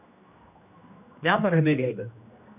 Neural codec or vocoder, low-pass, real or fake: codec, 16 kHz, 1 kbps, X-Codec, HuBERT features, trained on general audio; 3.6 kHz; fake